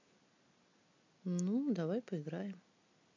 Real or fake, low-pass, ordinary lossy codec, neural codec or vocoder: real; 7.2 kHz; MP3, 48 kbps; none